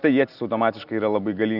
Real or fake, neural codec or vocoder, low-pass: real; none; 5.4 kHz